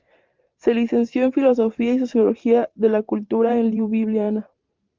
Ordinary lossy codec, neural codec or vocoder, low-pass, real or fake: Opus, 32 kbps; vocoder, 44.1 kHz, 128 mel bands every 512 samples, BigVGAN v2; 7.2 kHz; fake